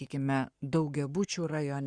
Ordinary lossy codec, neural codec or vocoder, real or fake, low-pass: Opus, 64 kbps; codec, 44.1 kHz, 7.8 kbps, Pupu-Codec; fake; 9.9 kHz